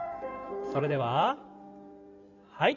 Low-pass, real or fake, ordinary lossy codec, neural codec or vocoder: 7.2 kHz; fake; none; codec, 16 kHz in and 24 kHz out, 2.2 kbps, FireRedTTS-2 codec